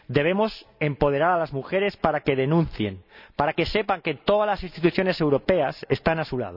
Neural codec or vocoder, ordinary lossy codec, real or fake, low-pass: none; none; real; 5.4 kHz